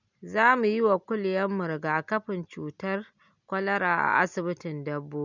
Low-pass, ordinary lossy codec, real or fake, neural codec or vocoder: 7.2 kHz; none; real; none